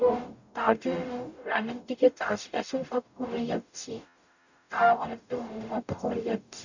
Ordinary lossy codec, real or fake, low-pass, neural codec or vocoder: none; fake; 7.2 kHz; codec, 44.1 kHz, 0.9 kbps, DAC